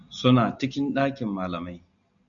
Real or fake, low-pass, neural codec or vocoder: real; 7.2 kHz; none